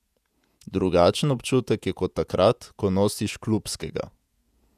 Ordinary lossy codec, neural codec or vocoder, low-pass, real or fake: none; vocoder, 48 kHz, 128 mel bands, Vocos; 14.4 kHz; fake